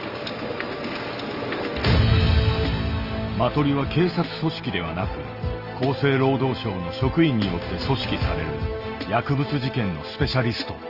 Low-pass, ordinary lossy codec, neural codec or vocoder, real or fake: 5.4 kHz; Opus, 24 kbps; none; real